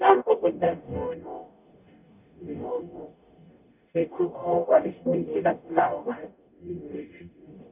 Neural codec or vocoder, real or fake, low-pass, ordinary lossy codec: codec, 44.1 kHz, 0.9 kbps, DAC; fake; 3.6 kHz; none